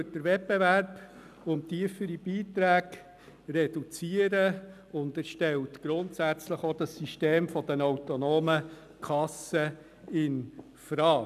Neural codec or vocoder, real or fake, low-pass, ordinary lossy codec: none; real; 14.4 kHz; none